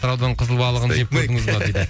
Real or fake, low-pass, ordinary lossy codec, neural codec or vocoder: real; none; none; none